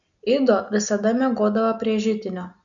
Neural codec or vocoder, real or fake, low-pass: none; real; 7.2 kHz